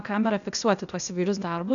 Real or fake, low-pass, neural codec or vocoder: fake; 7.2 kHz; codec, 16 kHz, 0.8 kbps, ZipCodec